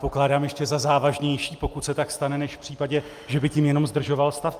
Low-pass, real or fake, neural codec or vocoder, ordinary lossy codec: 14.4 kHz; real; none; Opus, 32 kbps